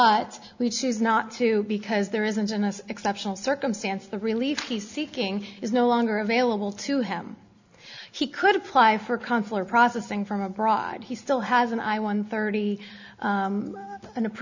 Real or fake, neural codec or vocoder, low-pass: real; none; 7.2 kHz